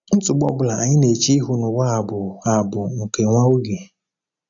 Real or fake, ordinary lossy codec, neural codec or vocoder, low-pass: real; none; none; 7.2 kHz